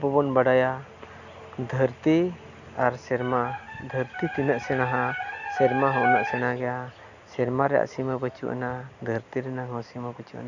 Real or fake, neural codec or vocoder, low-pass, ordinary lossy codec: real; none; 7.2 kHz; none